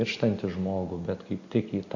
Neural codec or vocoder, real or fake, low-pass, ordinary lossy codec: none; real; 7.2 kHz; AAC, 48 kbps